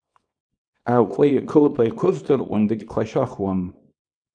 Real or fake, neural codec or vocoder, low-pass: fake; codec, 24 kHz, 0.9 kbps, WavTokenizer, small release; 9.9 kHz